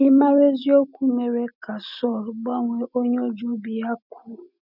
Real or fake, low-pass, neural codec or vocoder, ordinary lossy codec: real; 5.4 kHz; none; none